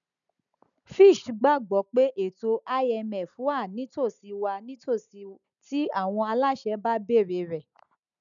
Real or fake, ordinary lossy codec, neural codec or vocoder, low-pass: real; none; none; 7.2 kHz